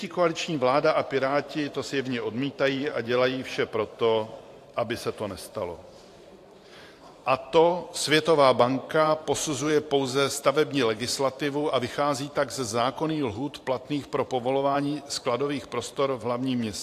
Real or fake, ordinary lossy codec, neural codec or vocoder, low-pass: fake; AAC, 64 kbps; vocoder, 44.1 kHz, 128 mel bands every 512 samples, BigVGAN v2; 14.4 kHz